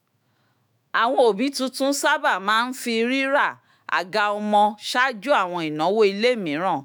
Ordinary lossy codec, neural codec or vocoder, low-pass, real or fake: none; autoencoder, 48 kHz, 128 numbers a frame, DAC-VAE, trained on Japanese speech; none; fake